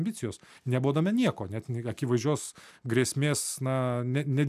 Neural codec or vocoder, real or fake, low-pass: none; real; 14.4 kHz